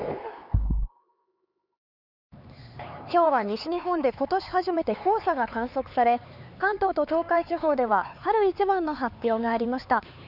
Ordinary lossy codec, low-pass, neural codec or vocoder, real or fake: none; 5.4 kHz; codec, 16 kHz, 4 kbps, X-Codec, HuBERT features, trained on LibriSpeech; fake